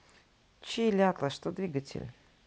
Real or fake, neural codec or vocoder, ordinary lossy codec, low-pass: real; none; none; none